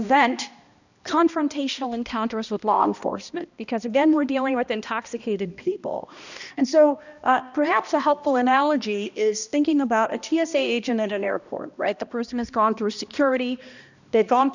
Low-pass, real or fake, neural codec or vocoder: 7.2 kHz; fake; codec, 16 kHz, 1 kbps, X-Codec, HuBERT features, trained on balanced general audio